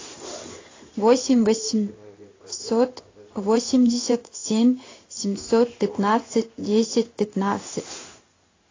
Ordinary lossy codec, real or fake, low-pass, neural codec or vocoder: AAC, 32 kbps; fake; 7.2 kHz; codec, 16 kHz in and 24 kHz out, 1 kbps, XY-Tokenizer